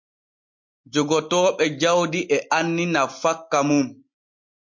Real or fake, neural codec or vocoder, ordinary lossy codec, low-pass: real; none; MP3, 48 kbps; 7.2 kHz